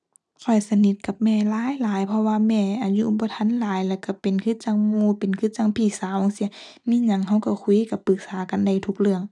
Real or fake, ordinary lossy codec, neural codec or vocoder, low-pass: real; none; none; none